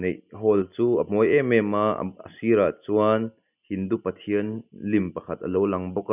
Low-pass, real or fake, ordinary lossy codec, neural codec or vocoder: 3.6 kHz; real; none; none